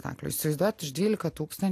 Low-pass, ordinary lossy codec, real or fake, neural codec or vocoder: 14.4 kHz; AAC, 64 kbps; real; none